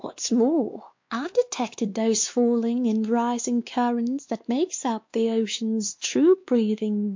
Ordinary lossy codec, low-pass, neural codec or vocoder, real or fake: MP3, 48 kbps; 7.2 kHz; codec, 16 kHz, 2 kbps, X-Codec, WavLM features, trained on Multilingual LibriSpeech; fake